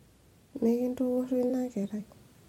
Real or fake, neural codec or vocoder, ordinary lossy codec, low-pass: fake; vocoder, 44.1 kHz, 128 mel bands every 256 samples, BigVGAN v2; MP3, 64 kbps; 19.8 kHz